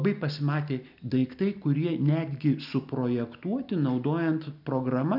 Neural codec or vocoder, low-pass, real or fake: none; 5.4 kHz; real